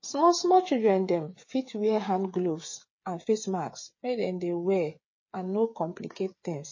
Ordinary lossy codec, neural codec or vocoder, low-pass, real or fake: MP3, 32 kbps; codec, 16 kHz, 8 kbps, FreqCodec, smaller model; 7.2 kHz; fake